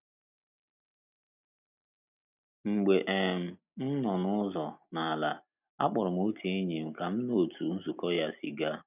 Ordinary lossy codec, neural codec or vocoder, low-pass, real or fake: none; none; 3.6 kHz; real